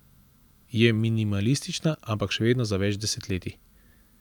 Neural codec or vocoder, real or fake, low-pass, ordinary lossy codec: vocoder, 44.1 kHz, 128 mel bands every 512 samples, BigVGAN v2; fake; 19.8 kHz; none